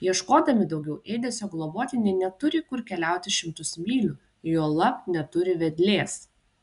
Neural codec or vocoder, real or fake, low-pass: none; real; 10.8 kHz